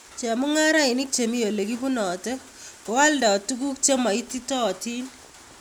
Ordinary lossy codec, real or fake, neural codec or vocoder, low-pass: none; real; none; none